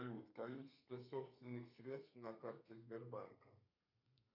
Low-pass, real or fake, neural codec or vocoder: 5.4 kHz; fake; codec, 32 kHz, 1.9 kbps, SNAC